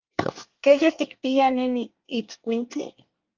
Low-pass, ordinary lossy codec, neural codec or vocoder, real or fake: 7.2 kHz; Opus, 24 kbps; codec, 24 kHz, 1 kbps, SNAC; fake